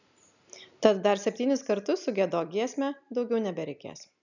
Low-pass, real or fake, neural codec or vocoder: 7.2 kHz; real; none